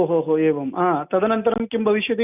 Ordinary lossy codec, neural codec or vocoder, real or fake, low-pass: none; none; real; 3.6 kHz